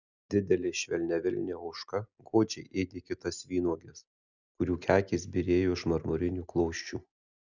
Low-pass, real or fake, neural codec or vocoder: 7.2 kHz; real; none